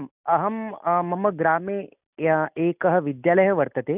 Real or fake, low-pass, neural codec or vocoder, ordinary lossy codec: real; 3.6 kHz; none; none